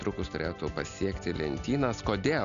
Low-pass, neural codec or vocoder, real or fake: 7.2 kHz; none; real